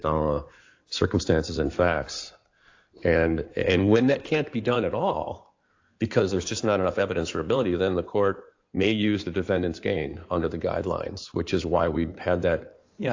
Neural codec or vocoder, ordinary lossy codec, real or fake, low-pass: codec, 16 kHz in and 24 kHz out, 2.2 kbps, FireRedTTS-2 codec; AAC, 48 kbps; fake; 7.2 kHz